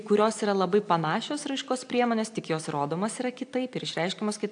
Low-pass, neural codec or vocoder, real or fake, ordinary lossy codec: 9.9 kHz; none; real; AAC, 64 kbps